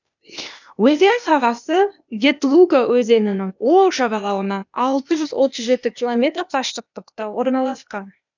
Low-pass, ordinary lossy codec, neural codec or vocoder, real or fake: 7.2 kHz; none; codec, 16 kHz, 0.8 kbps, ZipCodec; fake